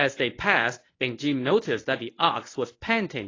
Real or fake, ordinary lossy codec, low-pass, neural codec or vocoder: fake; AAC, 32 kbps; 7.2 kHz; codec, 24 kHz, 0.9 kbps, WavTokenizer, medium speech release version 1